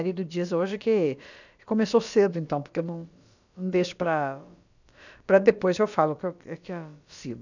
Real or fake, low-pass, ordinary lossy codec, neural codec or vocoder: fake; 7.2 kHz; none; codec, 16 kHz, about 1 kbps, DyCAST, with the encoder's durations